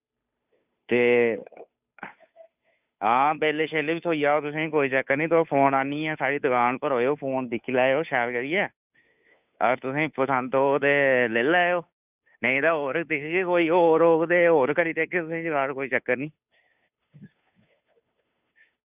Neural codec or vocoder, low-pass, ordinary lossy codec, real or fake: codec, 16 kHz, 2 kbps, FunCodec, trained on Chinese and English, 25 frames a second; 3.6 kHz; none; fake